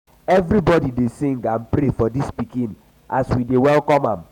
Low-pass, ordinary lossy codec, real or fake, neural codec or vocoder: 19.8 kHz; none; fake; vocoder, 48 kHz, 128 mel bands, Vocos